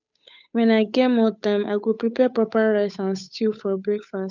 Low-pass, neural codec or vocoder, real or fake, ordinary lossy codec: 7.2 kHz; codec, 16 kHz, 8 kbps, FunCodec, trained on Chinese and English, 25 frames a second; fake; none